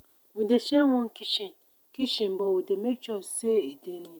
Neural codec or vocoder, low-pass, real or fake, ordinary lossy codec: vocoder, 48 kHz, 128 mel bands, Vocos; none; fake; none